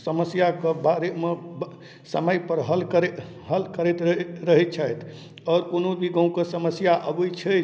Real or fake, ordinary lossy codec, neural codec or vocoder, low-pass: real; none; none; none